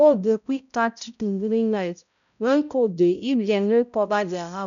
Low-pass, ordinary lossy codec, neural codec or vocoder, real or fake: 7.2 kHz; none; codec, 16 kHz, 0.5 kbps, X-Codec, HuBERT features, trained on balanced general audio; fake